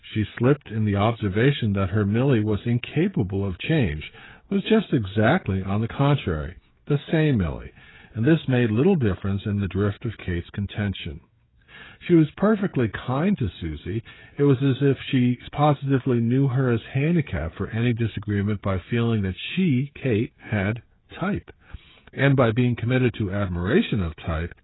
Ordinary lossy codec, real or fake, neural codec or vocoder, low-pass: AAC, 16 kbps; fake; codec, 16 kHz, 16 kbps, FreqCodec, smaller model; 7.2 kHz